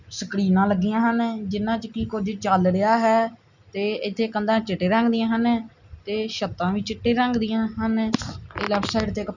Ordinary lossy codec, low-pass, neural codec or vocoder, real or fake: none; 7.2 kHz; none; real